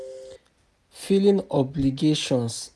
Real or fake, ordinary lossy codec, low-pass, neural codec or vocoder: real; none; none; none